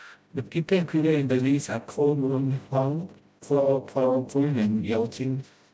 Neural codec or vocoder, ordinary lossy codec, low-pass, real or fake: codec, 16 kHz, 0.5 kbps, FreqCodec, smaller model; none; none; fake